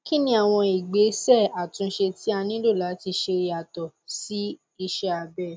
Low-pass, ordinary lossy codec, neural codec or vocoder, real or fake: none; none; none; real